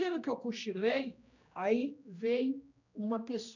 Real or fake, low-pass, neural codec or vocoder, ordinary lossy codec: fake; 7.2 kHz; codec, 16 kHz, 1 kbps, X-Codec, HuBERT features, trained on general audio; none